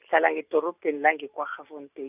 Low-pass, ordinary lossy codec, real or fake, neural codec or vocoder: 3.6 kHz; none; real; none